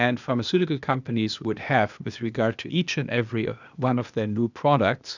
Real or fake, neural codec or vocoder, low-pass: fake; codec, 16 kHz, 0.8 kbps, ZipCodec; 7.2 kHz